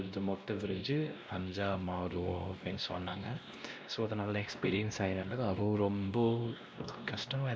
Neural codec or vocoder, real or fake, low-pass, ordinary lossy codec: codec, 16 kHz, 1 kbps, X-Codec, WavLM features, trained on Multilingual LibriSpeech; fake; none; none